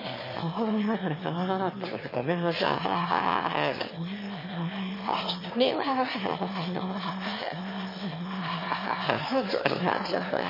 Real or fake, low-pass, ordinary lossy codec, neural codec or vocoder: fake; 5.4 kHz; MP3, 24 kbps; autoencoder, 22.05 kHz, a latent of 192 numbers a frame, VITS, trained on one speaker